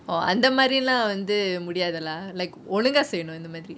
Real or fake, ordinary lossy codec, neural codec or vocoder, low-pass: real; none; none; none